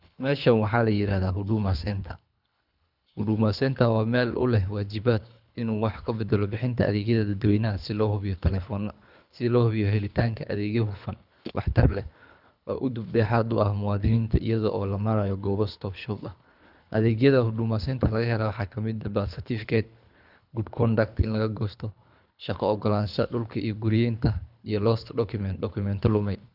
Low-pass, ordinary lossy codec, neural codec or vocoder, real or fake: 5.4 kHz; none; codec, 24 kHz, 3 kbps, HILCodec; fake